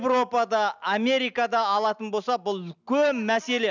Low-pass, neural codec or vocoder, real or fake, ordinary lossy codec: 7.2 kHz; none; real; none